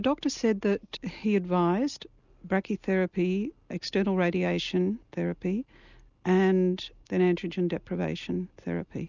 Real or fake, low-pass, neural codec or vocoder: real; 7.2 kHz; none